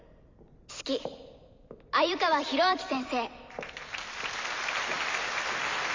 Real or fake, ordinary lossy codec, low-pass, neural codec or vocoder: fake; MP3, 64 kbps; 7.2 kHz; vocoder, 44.1 kHz, 128 mel bands every 256 samples, BigVGAN v2